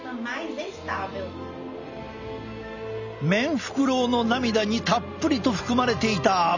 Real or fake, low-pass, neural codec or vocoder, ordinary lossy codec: real; 7.2 kHz; none; none